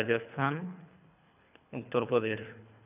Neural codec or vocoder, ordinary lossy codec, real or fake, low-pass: codec, 24 kHz, 3 kbps, HILCodec; none; fake; 3.6 kHz